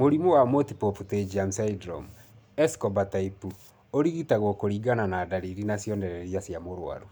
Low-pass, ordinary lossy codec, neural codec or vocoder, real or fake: none; none; none; real